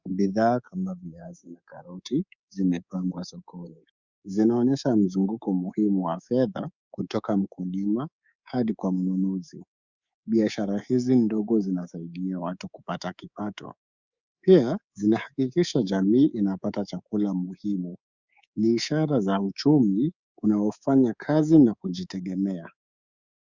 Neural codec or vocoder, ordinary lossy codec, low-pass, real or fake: codec, 24 kHz, 3.1 kbps, DualCodec; Opus, 64 kbps; 7.2 kHz; fake